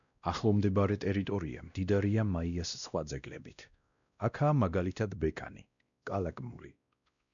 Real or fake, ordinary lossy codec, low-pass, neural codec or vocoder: fake; MP3, 96 kbps; 7.2 kHz; codec, 16 kHz, 1 kbps, X-Codec, WavLM features, trained on Multilingual LibriSpeech